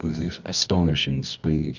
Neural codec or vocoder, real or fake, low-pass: codec, 24 kHz, 0.9 kbps, WavTokenizer, medium music audio release; fake; 7.2 kHz